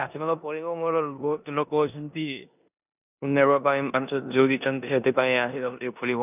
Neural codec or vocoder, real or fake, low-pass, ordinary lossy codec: codec, 16 kHz in and 24 kHz out, 0.9 kbps, LongCat-Audio-Codec, four codebook decoder; fake; 3.6 kHz; none